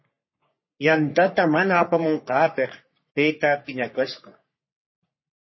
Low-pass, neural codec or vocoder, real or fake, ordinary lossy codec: 7.2 kHz; codec, 44.1 kHz, 3.4 kbps, Pupu-Codec; fake; MP3, 24 kbps